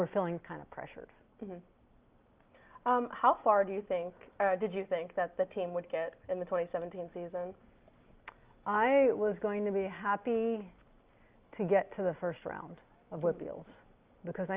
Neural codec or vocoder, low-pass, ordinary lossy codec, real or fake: none; 3.6 kHz; Opus, 32 kbps; real